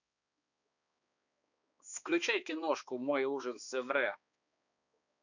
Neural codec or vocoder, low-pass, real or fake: codec, 16 kHz, 2 kbps, X-Codec, HuBERT features, trained on balanced general audio; 7.2 kHz; fake